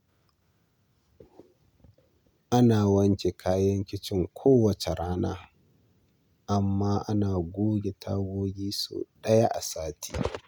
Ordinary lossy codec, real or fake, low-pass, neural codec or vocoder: none; real; none; none